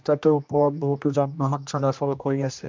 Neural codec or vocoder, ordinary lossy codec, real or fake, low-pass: codec, 16 kHz, 1 kbps, X-Codec, HuBERT features, trained on general audio; MP3, 64 kbps; fake; 7.2 kHz